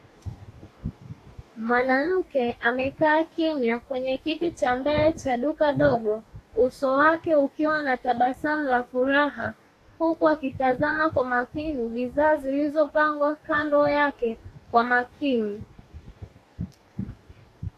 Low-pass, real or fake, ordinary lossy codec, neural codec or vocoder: 14.4 kHz; fake; AAC, 64 kbps; codec, 44.1 kHz, 2.6 kbps, DAC